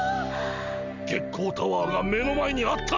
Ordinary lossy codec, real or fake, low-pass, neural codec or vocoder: none; real; 7.2 kHz; none